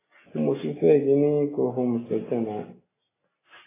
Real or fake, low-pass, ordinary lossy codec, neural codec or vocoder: real; 3.6 kHz; AAC, 16 kbps; none